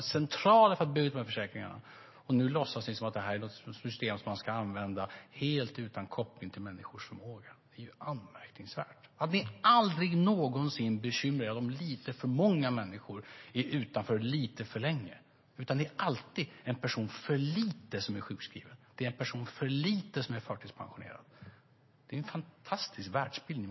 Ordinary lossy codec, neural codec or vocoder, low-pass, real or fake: MP3, 24 kbps; none; 7.2 kHz; real